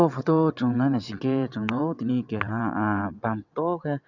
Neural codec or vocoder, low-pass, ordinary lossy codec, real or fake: vocoder, 22.05 kHz, 80 mel bands, WaveNeXt; 7.2 kHz; none; fake